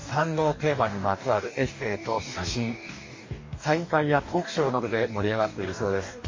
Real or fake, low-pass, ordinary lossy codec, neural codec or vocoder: fake; 7.2 kHz; MP3, 32 kbps; codec, 44.1 kHz, 2.6 kbps, DAC